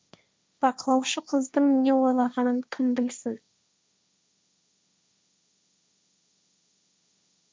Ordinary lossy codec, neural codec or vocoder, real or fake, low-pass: none; codec, 16 kHz, 1.1 kbps, Voila-Tokenizer; fake; none